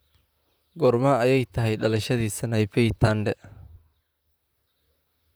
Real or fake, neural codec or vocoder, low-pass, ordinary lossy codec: fake; vocoder, 44.1 kHz, 128 mel bands, Pupu-Vocoder; none; none